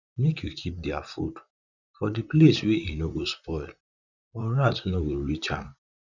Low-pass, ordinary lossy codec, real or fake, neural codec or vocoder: 7.2 kHz; none; fake; vocoder, 44.1 kHz, 128 mel bands, Pupu-Vocoder